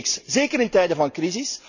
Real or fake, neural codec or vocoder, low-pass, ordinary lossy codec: real; none; 7.2 kHz; none